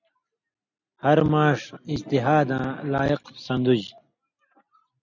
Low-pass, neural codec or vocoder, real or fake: 7.2 kHz; none; real